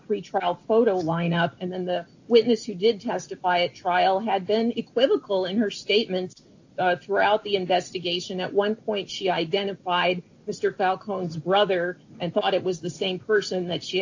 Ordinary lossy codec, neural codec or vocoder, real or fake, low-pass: AAC, 48 kbps; none; real; 7.2 kHz